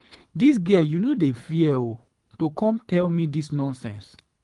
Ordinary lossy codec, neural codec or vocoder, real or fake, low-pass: Opus, 32 kbps; codec, 24 kHz, 3 kbps, HILCodec; fake; 10.8 kHz